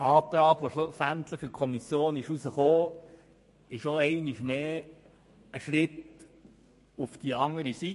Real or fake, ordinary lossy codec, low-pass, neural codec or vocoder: fake; MP3, 48 kbps; 14.4 kHz; codec, 32 kHz, 1.9 kbps, SNAC